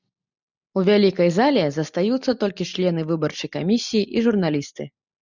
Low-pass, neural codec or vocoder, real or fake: 7.2 kHz; none; real